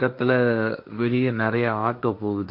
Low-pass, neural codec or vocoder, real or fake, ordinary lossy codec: 5.4 kHz; codec, 16 kHz, 1.1 kbps, Voila-Tokenizer; fake; MP3, 48 kbps